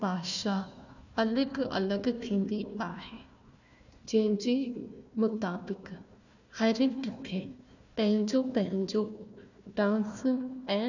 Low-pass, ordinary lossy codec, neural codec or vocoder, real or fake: 7.2 kHz; none; codec, 16 kHz, 1 kbps, FunCodec, trained on Chinese and English, 50 frames a second; fake